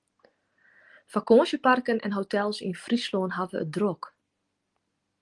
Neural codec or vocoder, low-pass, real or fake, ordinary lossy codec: none; 10.8 kHz; real; Opus, 24 kbps